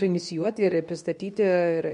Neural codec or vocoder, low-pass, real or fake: codec, 24 kHz, 0.9 kbps, WavTokenizer, medium speech release version 2; 10.8 kHz; fake